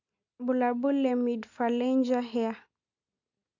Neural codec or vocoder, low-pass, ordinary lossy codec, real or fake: none; 7.2 kHz; MP3, 64 kbps; real